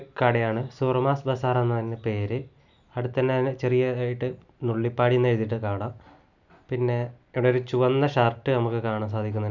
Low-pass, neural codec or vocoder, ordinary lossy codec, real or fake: 7.2 kHz; none; none; real